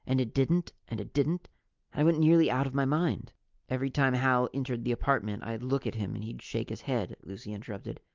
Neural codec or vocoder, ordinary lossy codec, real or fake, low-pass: none; Opus, 32 kbps; real; 7.2 kHz